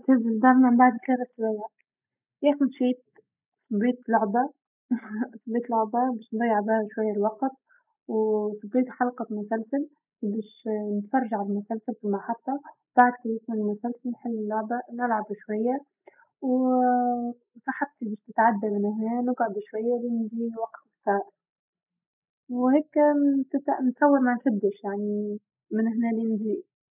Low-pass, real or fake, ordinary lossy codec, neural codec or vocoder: 3.6 kHz; real; none; none